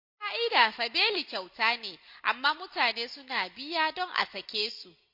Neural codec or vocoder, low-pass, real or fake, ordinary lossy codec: none; 5.4 kHz; real; MP3, 32 kbps